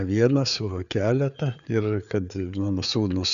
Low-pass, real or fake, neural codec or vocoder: 7.2 kHz; fake; codec, 16 kHz, 4 kbps, FreqCodec, larger model